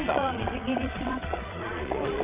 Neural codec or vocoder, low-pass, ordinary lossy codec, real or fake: vocoder, 22.05 kHz, 80 mel bands, Vocos; 3.6 kHz; Opus, 64 kbps; fake